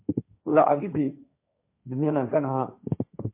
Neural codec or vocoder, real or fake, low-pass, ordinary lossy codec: codec, 16 kHz, 1.1 kbps, Voila-Tokenizer; fake; 3.6 kHz; MP3, 24 kbps